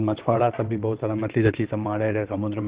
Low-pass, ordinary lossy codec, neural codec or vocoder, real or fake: 3.6 kHz; Opus, 16 kbps; vocoder, 22.05 kHz, 80 mel bands, WaveNeXt; fake